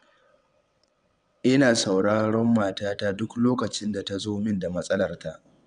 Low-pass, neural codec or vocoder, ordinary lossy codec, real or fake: 9.9 kHz; vocoder, 22.05 kHz, 80 mel bands, WaveNeXt; none; fake